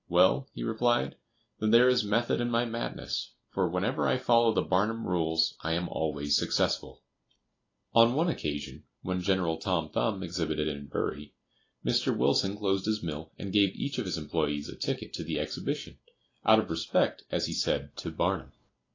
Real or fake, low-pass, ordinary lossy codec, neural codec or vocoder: real; 7.2 kHz; AAC, 32 kbps; none